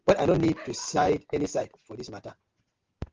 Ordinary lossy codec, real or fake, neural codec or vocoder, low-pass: Opus, 32 kbps; real; none; 7.2 kHz